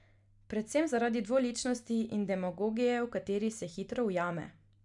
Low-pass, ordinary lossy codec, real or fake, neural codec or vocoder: 10.8 kHz; none; real; none